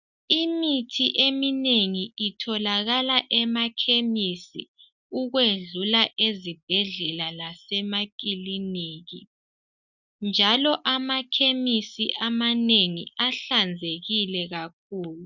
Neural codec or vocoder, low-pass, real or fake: none; 7.2 kHz; real